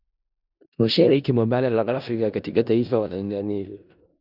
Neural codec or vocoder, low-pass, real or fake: codec, 16 kHz in and 24 kHz out, 0.4 kbps, LongCat-Audio-Codec, four codebook decoder; 5.4 kHz; fake